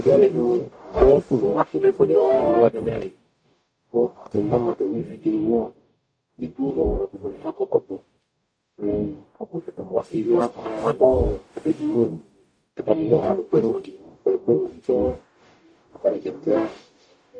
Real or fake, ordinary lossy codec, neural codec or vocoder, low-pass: fake; AAC, 48 kbps; codec, 44.1 kHz, 0.9 kbps, DAC; 9.9 kHz